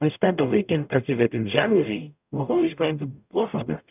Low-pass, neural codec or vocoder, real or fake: 3.6 kHz; codec, 44.1 kHz, 0.9 kbps, DAC; fake